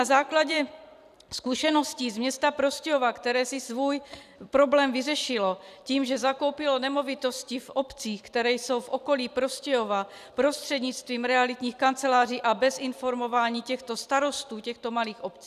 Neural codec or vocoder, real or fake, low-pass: vocoder, 44.1 kHz, 128 mel bands every 256 samples, BigVGAN v2; fake; 14.4 kHz